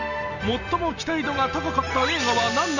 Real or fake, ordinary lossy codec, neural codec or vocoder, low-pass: real; none; none; 7.2 kHz